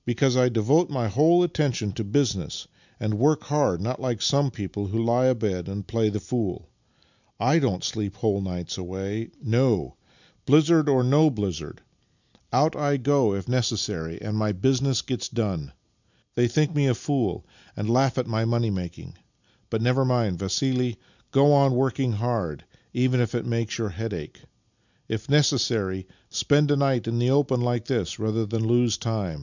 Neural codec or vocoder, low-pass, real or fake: none; 7.2 kHz; real